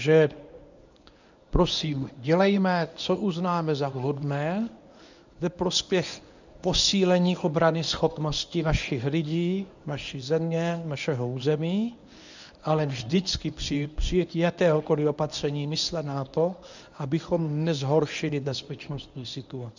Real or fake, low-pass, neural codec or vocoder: fake; 7.2 kHz; codec, 24 kHz, 0.9 kbps, WavTokenizer, medium speech release version 1